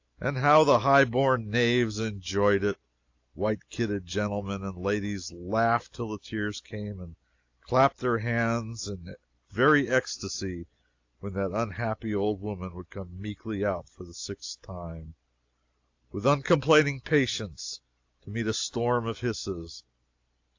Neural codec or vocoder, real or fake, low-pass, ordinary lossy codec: none; real; 7.2 kHz; AAC, 48 kbps